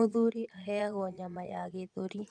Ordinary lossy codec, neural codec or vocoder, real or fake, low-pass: none; vocoder, 22.05 kHz, 80 mel bands, Vocos; fake; 9.9 kHz